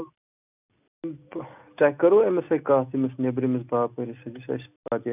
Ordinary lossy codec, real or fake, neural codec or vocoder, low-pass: none; real; none; 3.6 kHz